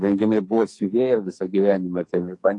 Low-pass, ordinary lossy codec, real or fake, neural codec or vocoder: 10.8 kHz; AAC, 48 kbps; fake; codec, 44.1 kHz, 2.6 kbps, SNAC